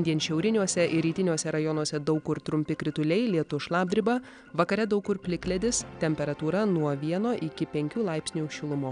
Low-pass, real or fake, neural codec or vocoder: 9.9 kHz; real; none